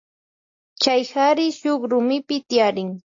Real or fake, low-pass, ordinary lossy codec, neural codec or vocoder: real; 7.2 kHz; AAC, 48 kbps; none